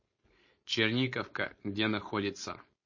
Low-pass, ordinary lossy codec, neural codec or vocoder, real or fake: 7.2 kHz; MP3, 32 kbps; codec, 16 kHz, 4.8 kbps, FACodec; fake